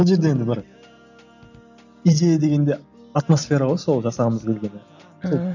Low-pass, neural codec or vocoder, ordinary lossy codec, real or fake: 7.2 kHz; none; none; real